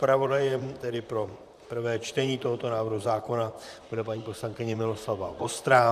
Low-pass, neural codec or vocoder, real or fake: 14.4 kHz; vocoder, 44.1 kHz, 128 mel bands, Pupu-Vocoder; fake